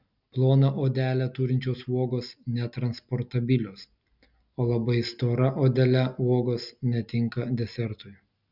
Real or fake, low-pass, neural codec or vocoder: real; 5.4 kHz; none